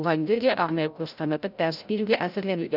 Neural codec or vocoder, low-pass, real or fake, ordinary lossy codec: codec, 16 kHz, 0.5 kbps, FreqCodec, larger model; 5.4 kHz; fake; none